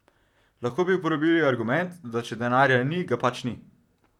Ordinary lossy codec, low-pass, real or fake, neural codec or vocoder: none; 19.8 kHz; fake; vocoder, 44.1 kHz, 128 mel bands, Pupu-Vocoder